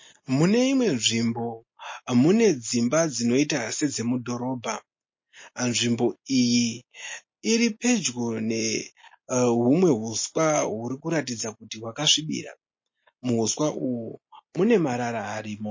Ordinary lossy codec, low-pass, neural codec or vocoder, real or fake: MP3, 32 kbps; 7.2 kHz; none; real